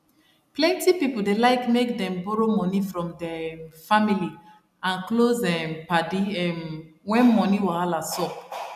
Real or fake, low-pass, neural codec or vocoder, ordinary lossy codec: real; 14.4 kHz; none; none